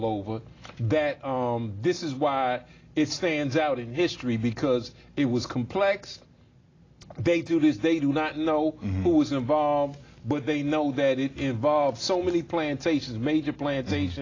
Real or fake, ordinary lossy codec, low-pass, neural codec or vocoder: real; AAC, 32 kbps; 7.2 kHz; none